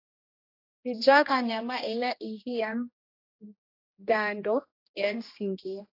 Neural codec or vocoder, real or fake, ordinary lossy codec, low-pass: codec, 16 kHz, 1 kbps, X-Codec, HuBERT features, trained on general audio; fake; AAC, 32 kbps; 5.4 kHz